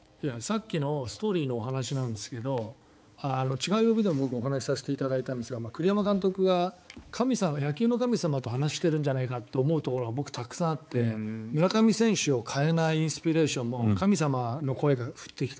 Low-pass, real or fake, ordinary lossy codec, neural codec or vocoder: none; fake; none; codec, 16 kHz, 4 kbps, X-Codec, HuBERT features, trained on balanced general audio